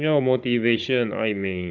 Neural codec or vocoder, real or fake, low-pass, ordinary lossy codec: codec, 16 kHz, 16 kbps, FunCodec, trained on Chinese and English, 50 frames a second; fake; 7.2 kHz; none